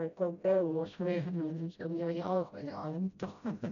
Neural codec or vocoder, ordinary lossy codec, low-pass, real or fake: codec, 16 kHz, 0.5 kbps, FreqCodec, smaller model; none; 7.2 kHz; fake